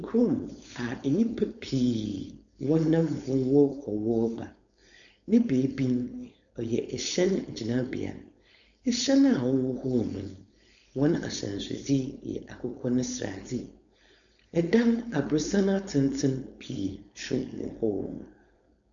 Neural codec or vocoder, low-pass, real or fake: codec, 16 kHz, 4.8 kbps, FACodec; 7.2 kHz; fake